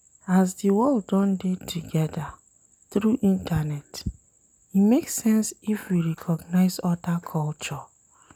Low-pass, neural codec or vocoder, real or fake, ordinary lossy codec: 19.8 kHz; none; real; none